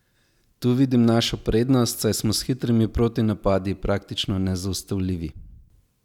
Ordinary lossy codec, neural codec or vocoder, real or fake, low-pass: none; none; real; 19.8 kHz